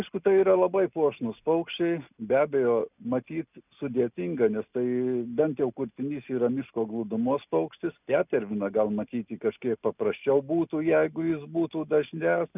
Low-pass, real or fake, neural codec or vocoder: 3.6 kHz; real; none